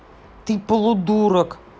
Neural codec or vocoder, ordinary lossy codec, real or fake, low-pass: none; none; real; none